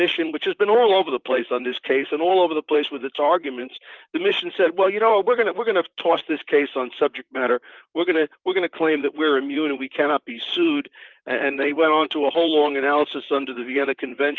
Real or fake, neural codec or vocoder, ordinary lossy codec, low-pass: fake; vocoder, 44.1 kHz, 128 mel bands, Pupu-Vocoder; Opus, 32 kbps; 7.2 kHz